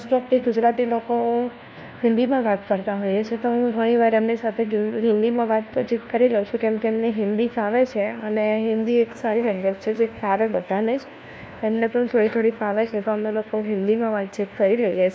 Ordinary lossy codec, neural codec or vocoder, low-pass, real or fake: none; codec, 16 kHz, 1 kbps, FunCodec, trained on LibriTTS, 50 frames a second; none; fake